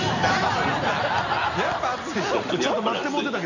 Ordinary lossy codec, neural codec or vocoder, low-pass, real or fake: none; none; 7.2 kHz; real